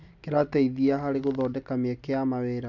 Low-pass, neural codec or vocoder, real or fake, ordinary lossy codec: 7.2 kHz; none; real; none